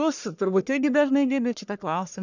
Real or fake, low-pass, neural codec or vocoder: fake; 7.2 kHz; codec, 44.1 kHz, 1.7 kbps, Pupu-Codec